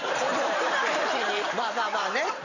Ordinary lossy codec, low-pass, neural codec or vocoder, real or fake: none; 7.2 kHz; vocoder, 44.1 kHz, 128 mel bands every 512 samples, BigVGAN v2; fake